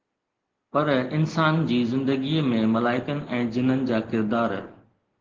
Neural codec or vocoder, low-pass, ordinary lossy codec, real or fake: none; 7.2 kHz; Opus, 16 kbps; real